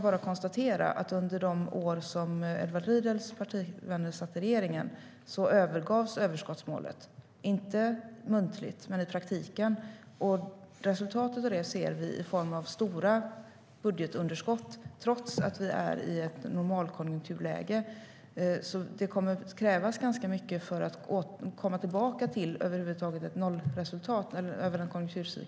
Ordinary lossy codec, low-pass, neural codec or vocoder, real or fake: none; none; none; real